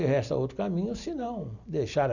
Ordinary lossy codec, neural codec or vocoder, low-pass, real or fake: none; none; 7.2 kHz; real